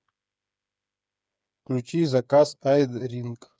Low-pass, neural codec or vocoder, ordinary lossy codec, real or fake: none; codec, 16 kHz, 8 kbps, FreqCodec, smaller model; none; fake